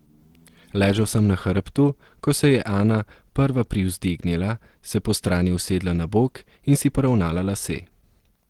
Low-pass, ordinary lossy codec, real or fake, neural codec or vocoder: 19.8 kHz; Opus, 16 kbps; real; none